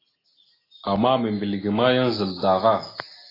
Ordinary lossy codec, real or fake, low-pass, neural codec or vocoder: AAC, 24 kbps; real; 5.4 kHz; none